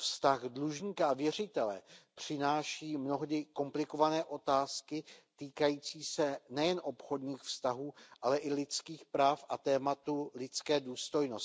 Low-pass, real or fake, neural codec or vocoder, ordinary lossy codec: none; real; none; none